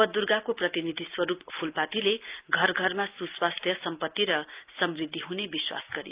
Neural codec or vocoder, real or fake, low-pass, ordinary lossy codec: none; real; 3.6 kHz; Opus, 24 kbps